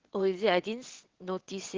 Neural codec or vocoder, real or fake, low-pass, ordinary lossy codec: vocoder, 22.05 kHz, 80 mel bands, WaveNeXt; fake; 7.2 kHz; Opus, 16 kbps